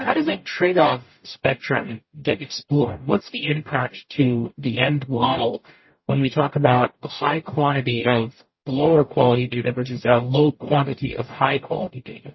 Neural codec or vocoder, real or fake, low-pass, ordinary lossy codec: codec, 44.1 kHz, 0.9 kbps, DAC; fake; 7.2 kHz; MP3, 24 kbps